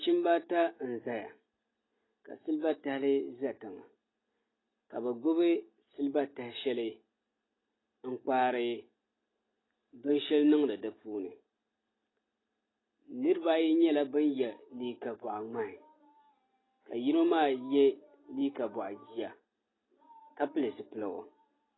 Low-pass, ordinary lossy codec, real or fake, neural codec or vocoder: 7.2 kHz; AAC, 16 kbps; real; none